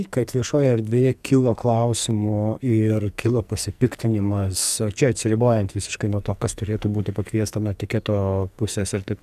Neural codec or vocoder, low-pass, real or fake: codec, 44.1 kHz, 2.6 kbps, SNAC; 14.4 kHz; fake